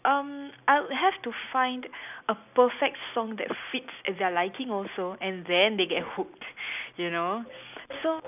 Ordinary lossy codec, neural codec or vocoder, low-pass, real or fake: none; none; 3.6 kHz; real